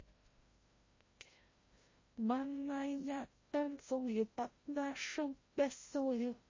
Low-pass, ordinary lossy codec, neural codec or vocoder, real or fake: 7.2 kHz; MP3, 32 kbps; codec, 16 kHz, 0.5 kbps, FreqCodec, larger model; fake